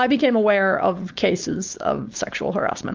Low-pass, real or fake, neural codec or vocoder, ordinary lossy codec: 7.2 kHz; fake; codec, 16 kHz, 4.8 kbps, FACodec; Opus, 24 kbps